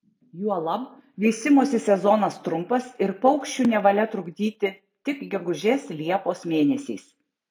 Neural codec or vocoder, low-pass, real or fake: none; 19.8 kHz; real